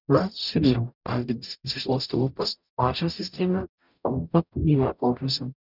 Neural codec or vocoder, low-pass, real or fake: codec, 44.1 kHz, 0.9 kbps, DAC; 5.4 kHz; fake